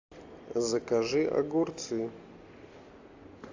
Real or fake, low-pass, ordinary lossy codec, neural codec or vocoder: real; 7.2 kHz; AAC, 48 kbps; none